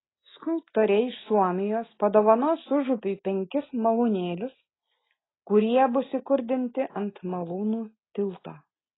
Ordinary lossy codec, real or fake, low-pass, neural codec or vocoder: AAC, 16 kbps; real; 7.2 kHz; none